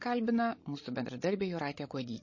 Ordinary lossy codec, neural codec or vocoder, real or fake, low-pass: MP3, 32 kbps; codec, 16 kHz, 16 kbps, FreqCodec, larger model; fake; 7.2 kHz